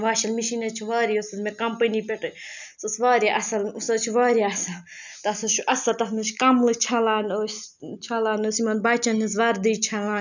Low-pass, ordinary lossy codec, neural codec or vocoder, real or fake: 7.2 kHz; none; none; real